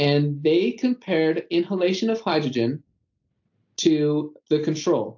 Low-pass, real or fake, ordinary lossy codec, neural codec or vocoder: 7.2 kHz; real; AAC, 48 kbps; none